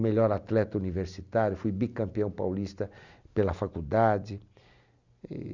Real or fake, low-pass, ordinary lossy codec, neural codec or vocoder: real; 7.2 kHz; Opus, 64 kbps; none